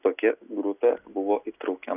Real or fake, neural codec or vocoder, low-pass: real; none; 3.6 kHz